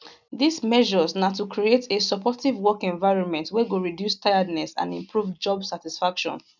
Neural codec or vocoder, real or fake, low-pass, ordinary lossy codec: none; real; 7.2 kHz; none